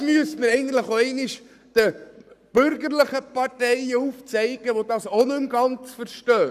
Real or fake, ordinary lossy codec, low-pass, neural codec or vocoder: fake; none; 14.4 kHz; codec, 44.1 kHz, 7.8 kbps, Pupu-Codec